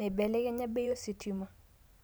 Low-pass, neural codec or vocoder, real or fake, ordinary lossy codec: none; vocoder, 44.1 kHz, 128 mel bands every 512 samples, BigVGAN v2; fake; none